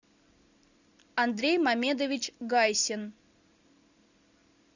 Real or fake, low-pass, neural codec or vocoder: real; 7.2 kHz; none